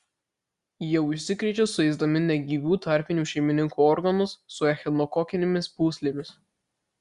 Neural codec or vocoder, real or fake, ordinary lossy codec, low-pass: none; real; Opus, 64 kbps; 10.8 kHz